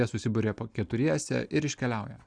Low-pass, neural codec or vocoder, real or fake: 9.9 kHz; none; real